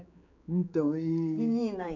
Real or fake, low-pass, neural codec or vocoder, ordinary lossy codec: fake; 7.2 kHz; codec, 16 kHz, 4 kbps, X-Codec, HuBERT features, trained on general audio; none